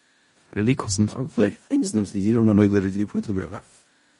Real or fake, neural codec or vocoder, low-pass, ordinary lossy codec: fake; codec, 16 kHz in and 24 kHz out, 0.4 kbps, LongCat-Audio-Codec, four codebook decoder; 10.8 kHz; MP3, 48 kbps